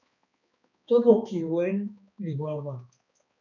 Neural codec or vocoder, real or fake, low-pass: codec, 16 kHz, 2 kbps, X-Codec, HuBERT features, trained on balanced general audio; fake; 7.2 kHz